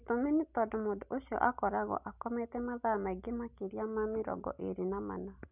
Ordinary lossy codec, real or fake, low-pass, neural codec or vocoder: none; real; 3.6 kHz; none